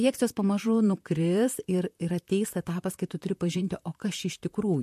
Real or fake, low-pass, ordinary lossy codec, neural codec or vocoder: fake; 14.4 kHz; MP3, 64 kbps; vocoder, 44.1 kHz, 128 mel bands, Pupu-Vocoder